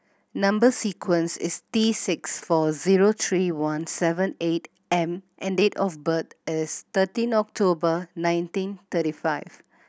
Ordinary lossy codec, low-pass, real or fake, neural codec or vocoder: none; none; real; none